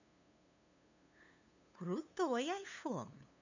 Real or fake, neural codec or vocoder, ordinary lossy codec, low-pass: fake; codec, 16 kHz, 2 kbps, FunCodec, trained on LibriTTS, 25 frames a second; none; 7.2 kHz